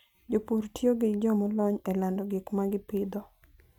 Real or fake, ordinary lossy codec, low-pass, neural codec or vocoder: real; none; 19.8 kHz; none